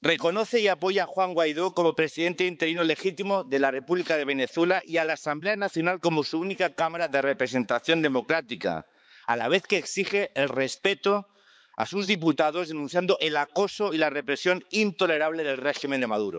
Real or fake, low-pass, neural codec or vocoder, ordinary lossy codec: fake; none; codec, 16 kHz, 4 kbps, X-Codec, HuBERT features, trained on balanced general audio; none